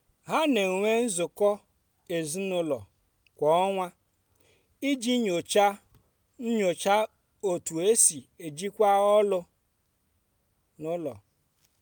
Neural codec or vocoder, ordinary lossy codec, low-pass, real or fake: none; none; none; real